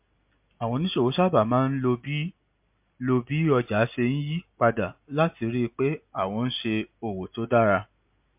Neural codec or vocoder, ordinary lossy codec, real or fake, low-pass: none; MP3, 32 kbps; real; 3.6 kHz